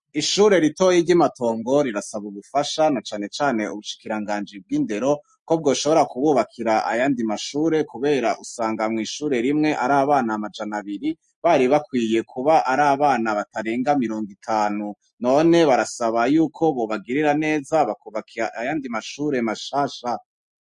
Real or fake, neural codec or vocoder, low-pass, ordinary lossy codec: real; none; 10.8 kHz; MP3, 48 kbps